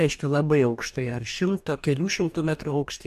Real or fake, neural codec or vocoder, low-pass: fake; codec, 44.1 kHz, 2.6 kbps, DAC; 14.4 kHz